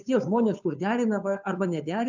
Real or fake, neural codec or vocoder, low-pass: fake; codec, 16 kHz, 2 kbps, FunCodec, trained on Chinese and English, 25 frames a second; 7.2 kHz